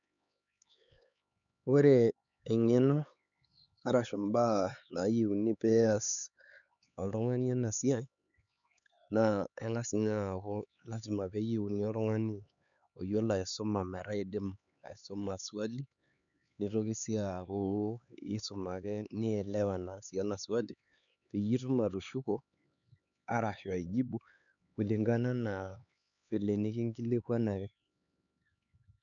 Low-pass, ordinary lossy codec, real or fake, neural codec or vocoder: 7.2 kHz; none; fake; codec, 16 kHz, 4 kbps, X-Codec, HuBERT features, trained on LibriSpeech